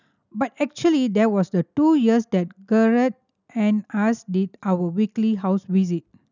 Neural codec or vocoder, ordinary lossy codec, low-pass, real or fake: none; none; 7.2 kHz; real